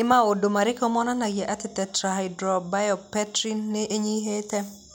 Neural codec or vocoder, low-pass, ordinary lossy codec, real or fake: none; none; none; real